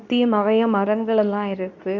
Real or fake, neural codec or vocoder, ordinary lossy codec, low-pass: fake; codec, 24 kHz, 0.9 kbps, WavTokenizer, medium speech release version 2; none; 7.2 kHz